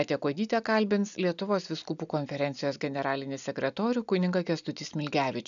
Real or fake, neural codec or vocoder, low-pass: real; none; 7.2 kHz